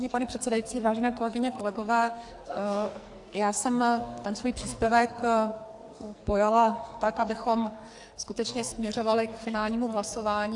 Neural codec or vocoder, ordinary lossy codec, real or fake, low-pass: codec, 44.1 kHz, 2.6 kbps, SNAC; AAC, 64 kbps; fake; 10.8 kHz